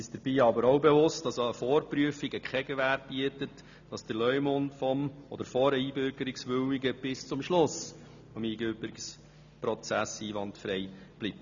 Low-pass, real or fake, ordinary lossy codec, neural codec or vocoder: 7.2 kHz; real; none; none